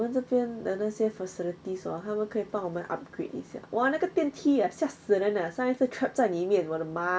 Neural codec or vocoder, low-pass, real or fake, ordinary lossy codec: none; none; real; none